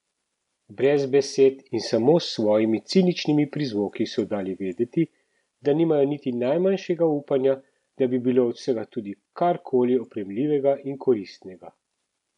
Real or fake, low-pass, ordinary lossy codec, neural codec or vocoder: real; 10.8 kHz; none; none